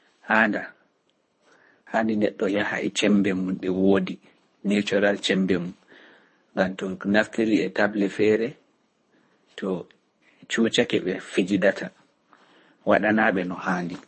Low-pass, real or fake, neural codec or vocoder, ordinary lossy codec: 10.8 kHz; fake; codec, 24 kHz, 3 kbps, HILCodec; MP3, 32 kbps